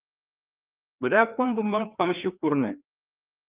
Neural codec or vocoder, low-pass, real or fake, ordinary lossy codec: codec, 16 kHz, 2 kbps, FreqCodec, larger model; 3.6 kHz; fake; Opus, 24 kbps